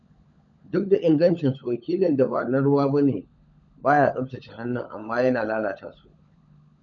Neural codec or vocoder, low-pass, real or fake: codec, 16 kHz, 16 kbps, FunCodec, trained on LibriTTS, 50 frames a second; 7.2 kHz; fake